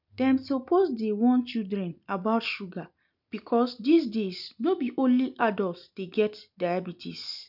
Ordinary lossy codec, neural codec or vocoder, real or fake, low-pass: none; none; real; 5.4 kHz